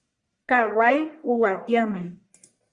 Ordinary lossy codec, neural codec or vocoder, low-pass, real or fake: Opus, 64 kbps; codec, 44.1 kHz, 1.7 kbps, Pupu-Codec; 10.8 kHz; fake